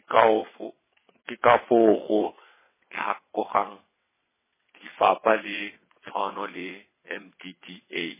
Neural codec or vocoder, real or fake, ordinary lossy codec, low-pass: vocoder, 22.05 kHz, 80 mel bands, WaveNeXt; fake; MP3, 16 kbps; 3.6 kHz